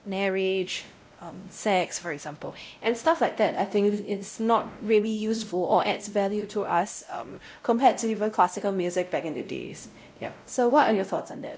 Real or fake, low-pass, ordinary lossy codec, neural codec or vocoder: fake; none; none; codec, 16 kHz, 0.5 kbps, X-Codec, WavLM features, trained on Multilingual LibriSpeech